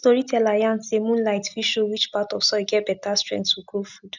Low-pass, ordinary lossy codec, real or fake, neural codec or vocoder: 7.2 kHz; none; real; none